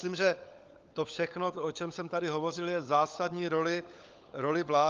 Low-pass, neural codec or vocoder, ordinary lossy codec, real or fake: 7.2 kHz; codec, 16 kHz, 16 kbps, FunCodec, trained on LibriTTS, 50 frames a second; Opus, 32 kbps; fake